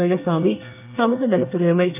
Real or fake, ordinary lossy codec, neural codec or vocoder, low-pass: fake; none; codec, 24 kHz, 1 kbps, SNAC; 3.6 kHz